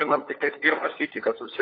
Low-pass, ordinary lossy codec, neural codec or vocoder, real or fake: 5.4 kHz; AAC, 32 kbps; codec, 16 kHz, 4 kbps, FunCodec, trained on LibriTTS, 50 frames a second; fake